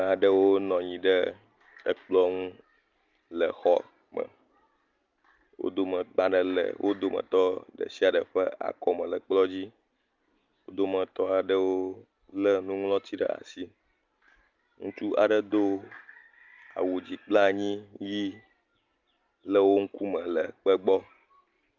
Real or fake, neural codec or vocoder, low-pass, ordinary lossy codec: real; none; 7.2 kHz; Opus, 24 kbps